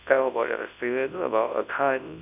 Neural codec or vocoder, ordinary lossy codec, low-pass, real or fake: codec, 24 kHz, 0.9 kbps, WavTokenizer, large speech release; none; 3.6 kHz; fake